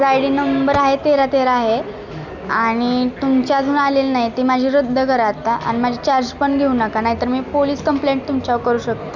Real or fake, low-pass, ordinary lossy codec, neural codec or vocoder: real; 7.2 kHz; none; none